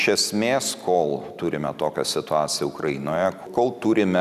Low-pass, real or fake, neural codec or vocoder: 14.4 kHz; real; none